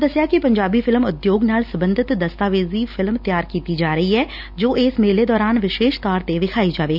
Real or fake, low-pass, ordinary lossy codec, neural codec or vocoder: real; 5.4 kHz; none; none